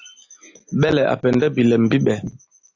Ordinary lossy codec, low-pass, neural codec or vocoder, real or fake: AAC, 48 kbps; 7.2 kHz; none; real